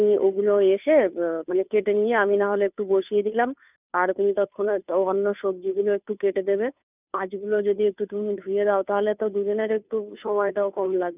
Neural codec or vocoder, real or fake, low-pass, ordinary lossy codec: codec, 16 kHz, 2 kbps, FunCodec, trained on Chinese and English, 25 frames a second; fake; 3.6 kHz; none